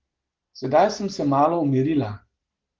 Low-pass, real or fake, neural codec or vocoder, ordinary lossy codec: 7.2 kHz; real; none; Opus, 16 kbps